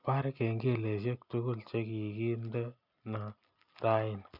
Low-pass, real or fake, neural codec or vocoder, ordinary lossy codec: 5.4 kHz; real; none; none